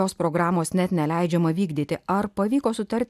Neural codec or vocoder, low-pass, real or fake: none; 14.4 kHz; real